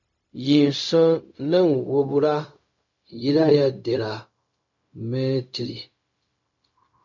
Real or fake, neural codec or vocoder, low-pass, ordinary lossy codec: fake; codec, 16 kHz, 0.4 kbps, LongCat-Audio-Codec; 7.2 kHz; MP3, 48 kbps